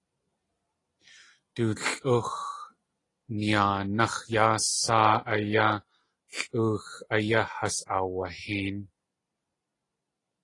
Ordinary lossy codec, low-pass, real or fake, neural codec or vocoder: AAC, 32 kbps; 10.8 kHz; real; none